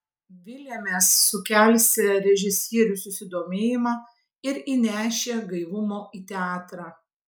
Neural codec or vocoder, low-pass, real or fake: none; 19.8 kHz; real